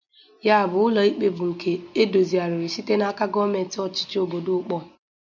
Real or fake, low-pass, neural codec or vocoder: real; 7.2 kHz; none